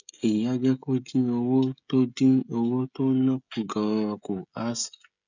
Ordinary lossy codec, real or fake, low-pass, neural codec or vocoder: none; fake; 7.2 kHz; codec, 16 kHz, 16 kbps, FreqCodec, smaller model